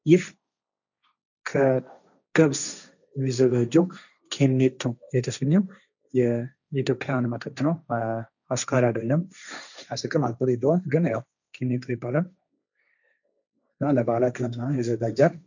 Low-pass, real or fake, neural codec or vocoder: 7.2 kHz; fake; codec, 16 kHz, 1.1 kbps, Voila-Tokenizer